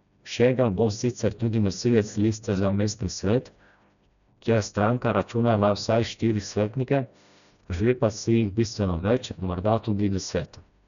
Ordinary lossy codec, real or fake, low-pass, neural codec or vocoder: none; fake; 7.2 kHz; codec, 16 kHz, 1 kbps, FreqCodec, smaller model